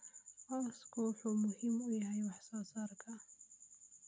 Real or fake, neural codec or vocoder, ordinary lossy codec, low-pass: real; none; none; none